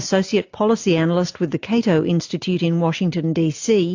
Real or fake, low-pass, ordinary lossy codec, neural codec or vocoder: real; 7.2 kHz; AAC, 48 kbps; none